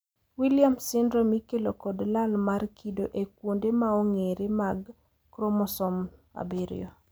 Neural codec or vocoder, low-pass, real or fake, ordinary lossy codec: none; none; real; none